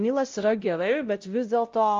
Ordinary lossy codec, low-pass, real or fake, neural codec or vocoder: Opus, 32 kbps; 7.2 kHz; fake; codec, 16 kHz, 0.5 kbps, X-Codec, WavLM features, trained on Multilingual LibriSpeech